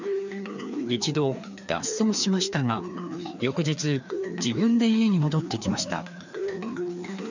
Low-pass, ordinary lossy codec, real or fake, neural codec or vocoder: 7.2 kHz; none; fake; codec, 16 kHz, 2 kbps, FreqCodec, larger model